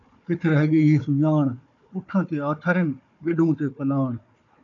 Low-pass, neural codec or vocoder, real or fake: 7.2 kHz; codec, 16 kHz, 4 kbps, FunCodec, trained on Chinese and English, 50 frames a second; fake